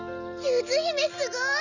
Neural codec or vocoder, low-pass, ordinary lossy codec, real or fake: none; 7.2 kHz; none; real